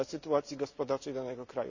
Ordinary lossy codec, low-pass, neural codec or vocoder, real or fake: none; 7.2 kHz; none; real